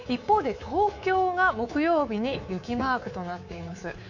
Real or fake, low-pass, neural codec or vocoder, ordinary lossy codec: fake; 7.2 kHz; codec, 24 kHz, 3.1 kbps, DualCodec; none